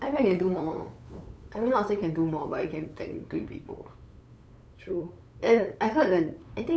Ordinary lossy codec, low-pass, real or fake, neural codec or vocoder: none; none; fake; codec, 16 kHz, 8 kbps, FunCodec, trained on LibriTTS, 25 frames a second